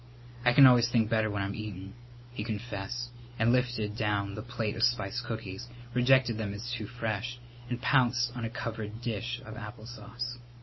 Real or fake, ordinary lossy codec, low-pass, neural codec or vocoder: real; MP3, 24 kbps; 7.2 kHz; none